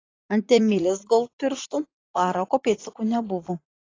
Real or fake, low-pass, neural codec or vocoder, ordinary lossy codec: fake; 7.2 kHz; vocoder, 44.1 kHz, 80 mel bands, Vocos; AAC, 32 kbps